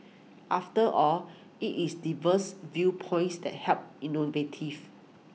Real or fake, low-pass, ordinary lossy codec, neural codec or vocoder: real; none; none; none